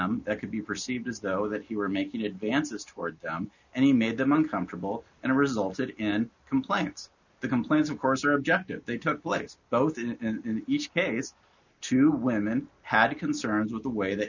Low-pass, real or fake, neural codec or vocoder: 7.2 kHz; real; none